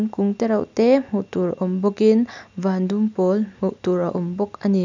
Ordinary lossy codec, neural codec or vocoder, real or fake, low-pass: none; none; real; 7.2 kHz